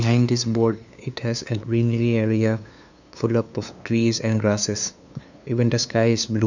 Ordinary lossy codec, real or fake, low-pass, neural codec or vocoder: none; fake; 7.2 kHz; codec, 16 kHz, 2 kbps, FunCodec, trained on LibriTTS, 25 frames a second